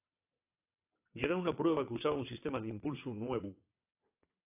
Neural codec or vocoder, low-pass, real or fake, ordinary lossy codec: vocoder, 22.05 kHz, 80 mel bands, WaveNeXt; 3.6 kHz; fake; AAC, 24 kbps